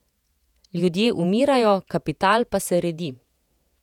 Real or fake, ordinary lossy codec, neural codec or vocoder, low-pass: fake; none; vocoder, 48 kHz, 128 mel bands, Vocos; 19.8 kHz